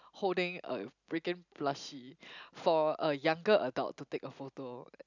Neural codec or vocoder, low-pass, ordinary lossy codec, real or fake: none; 7.2 kHz; none; real